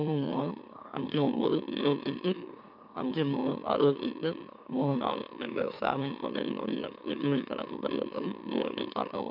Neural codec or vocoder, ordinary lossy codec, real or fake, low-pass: autoencoder, 44.1 kHz, a latent of 192 numbers a frame, MeloTTS; none; fake; 5.4 kHz